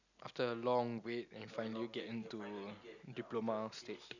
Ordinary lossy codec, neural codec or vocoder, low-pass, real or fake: none; none; 7.2 kHz; real